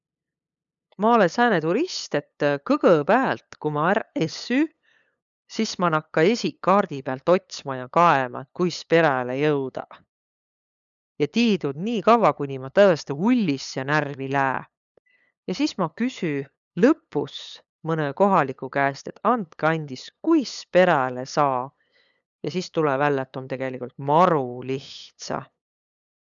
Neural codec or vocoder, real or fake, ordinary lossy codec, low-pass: codec, 16 kHz, 8 kbps, FunCodec, trained on LibriTTS, 25 frames a second; fake; none; 7.2 kHz